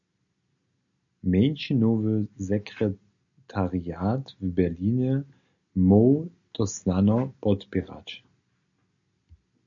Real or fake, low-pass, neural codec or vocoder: real; 7.2 kHz; none